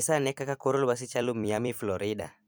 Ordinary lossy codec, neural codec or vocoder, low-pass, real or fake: none; vocoder, 44.1 kHz, 128 mel bands every 256 samples, BigVGAN v2; none; fake